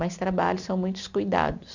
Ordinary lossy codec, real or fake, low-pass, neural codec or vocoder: none; real; 7.2 kHz; none